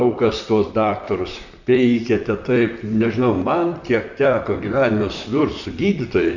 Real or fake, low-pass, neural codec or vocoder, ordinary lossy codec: fake; 7.2 kHz; vocoder, 44.1 kHz, 128 mel bands, Pupu-Vocoder; Opus, 64 kbps